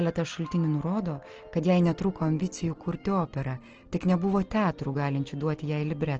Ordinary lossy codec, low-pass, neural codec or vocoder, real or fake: Opus, 16 kbps; 7.2 kHz; none; real